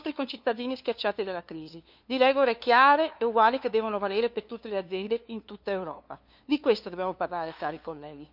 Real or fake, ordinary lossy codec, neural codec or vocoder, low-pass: fake; none; codec, 16 kHz, 2 kbps, FunCodec, trained on LibriTTS, 25 frames a second; 5.4 kHz